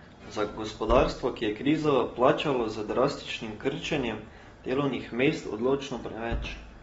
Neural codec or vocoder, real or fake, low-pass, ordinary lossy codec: none; real; 19.8 kHz; AAC, 24 kbps